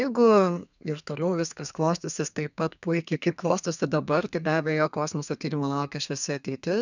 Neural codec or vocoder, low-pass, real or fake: codec, 32 kHz, 1.9 kbps, SNAC; 7.2 kHz; fake